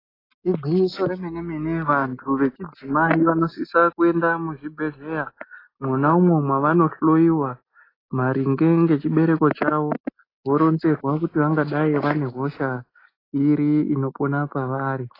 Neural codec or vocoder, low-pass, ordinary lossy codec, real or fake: none; 5.4 kHz; AAC, 24 kbps; real